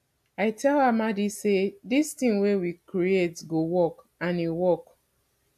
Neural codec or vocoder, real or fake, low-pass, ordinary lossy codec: none; real; 14.4 kHz; AAC, 96 kbps